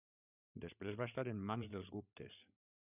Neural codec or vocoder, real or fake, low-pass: codec, 16 kHz, 8 kbps, FreqCodec, larger model; fake; 3.6 kHz